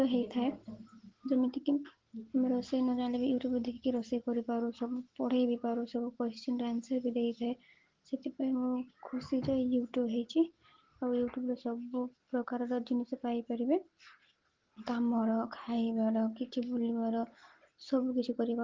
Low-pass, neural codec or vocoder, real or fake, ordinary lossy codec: 7.2 kHz; none; real; Opus, 16 kbps